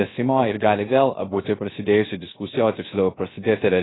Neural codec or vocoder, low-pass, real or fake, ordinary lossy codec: codec, 16 kHz, 0.3 kbps, FocalCodec; 7.2 kHz; fake; AAC, 16 kbps